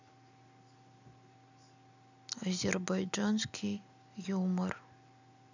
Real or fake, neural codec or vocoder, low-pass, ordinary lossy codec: real; none; 7.2 kHz; none